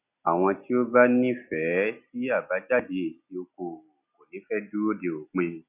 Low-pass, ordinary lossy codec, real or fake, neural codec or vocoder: 3.6 kHz; none; real; none